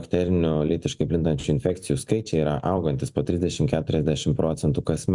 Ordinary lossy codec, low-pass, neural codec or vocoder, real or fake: MP3, 96 kbps; 10.8 kHz; vocoder, 44.1 kHz, 128 mel bands every 256 samples, BigVGAN v2; fake